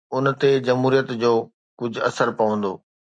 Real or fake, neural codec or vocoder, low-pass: real; none; 9.9 kHz